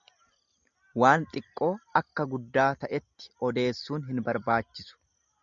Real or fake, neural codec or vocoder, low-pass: real; none; 7.2 kHz